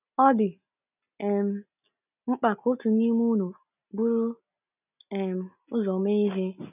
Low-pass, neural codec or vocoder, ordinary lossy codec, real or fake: 3.6 kHz; none; none; real